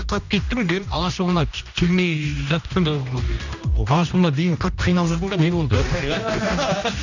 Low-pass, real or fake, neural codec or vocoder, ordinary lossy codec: 7.2 kHz; fake; codec, 16 kHz, 1 kbps, X-Codec, HuBERT features, trained on general audio; none